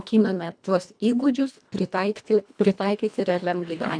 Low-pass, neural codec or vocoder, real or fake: 9.9 kHz; codec, 24 kHz, 1.5 kbps, HILCodec; fake